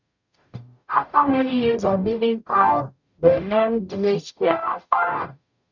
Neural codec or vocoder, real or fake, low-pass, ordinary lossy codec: codec, 44.1 kHz, 0.9 kbps, DAC; fake; 7.2 kHz; none